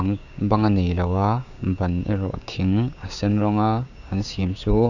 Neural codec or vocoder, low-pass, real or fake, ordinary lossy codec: codec, 16 kHz, 6 kbps, DAC; 7.2 kHz; fake; none